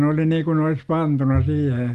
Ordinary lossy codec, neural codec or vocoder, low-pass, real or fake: Opus, 24 kbps; none; 14.4 kHz; real